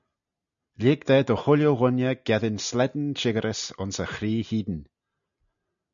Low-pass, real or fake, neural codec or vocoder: 7.2 kHz; real; none